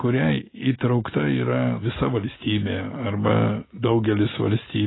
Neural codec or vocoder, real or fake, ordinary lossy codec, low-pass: none; real; AAC, 16 kbps; 7.2 kHz